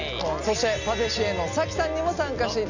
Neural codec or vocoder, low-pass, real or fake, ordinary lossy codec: none; 7.2 kHz; real; none